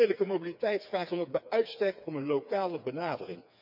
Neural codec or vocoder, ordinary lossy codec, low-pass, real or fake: codec, 16 kHz, 4 kbps, FreqCodec, smaller model; none; 5.4 kHz; fake